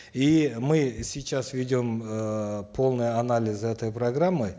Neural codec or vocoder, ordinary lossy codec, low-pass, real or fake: none; none; none; real